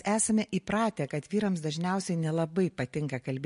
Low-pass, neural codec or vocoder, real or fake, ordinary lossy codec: 10.8 kHz; none; real; MP3, 48 kbps